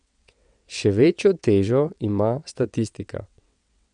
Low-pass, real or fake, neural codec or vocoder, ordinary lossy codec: 9.9 kHz; fake; vocoder, 22.05 kHz, 80 mel bands, WaveNeXt; none